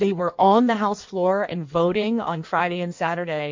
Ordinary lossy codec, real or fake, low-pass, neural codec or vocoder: MP3, 48 kbps; fake; 7.2 kHz; codec, 16 kHz in and 24 kHz out, 1.1 kbps, FireRedTTS-2 codec